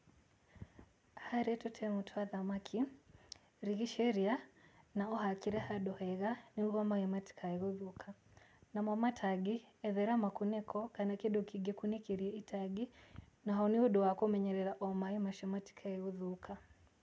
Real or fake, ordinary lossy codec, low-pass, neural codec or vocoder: real; none; none; none